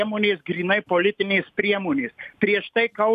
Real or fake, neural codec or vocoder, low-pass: real; none; 14.4 kHz